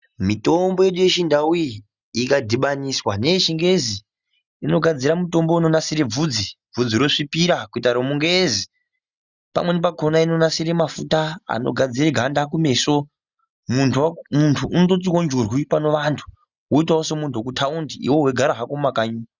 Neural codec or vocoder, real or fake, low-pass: none; real; 7.2 kHz